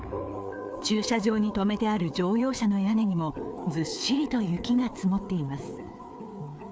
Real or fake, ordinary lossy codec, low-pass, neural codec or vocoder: fake; none; none; codec, 16 kHz, 4 kbps, FreqCodec, larger model